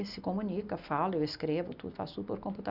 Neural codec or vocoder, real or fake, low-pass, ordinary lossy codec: none; real; 5.4 kHz; none